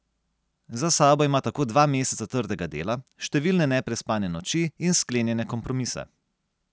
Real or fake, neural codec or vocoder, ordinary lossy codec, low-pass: real; none; none; none